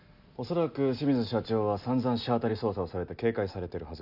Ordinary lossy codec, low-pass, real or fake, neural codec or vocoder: none; 5.4 kHz; real; none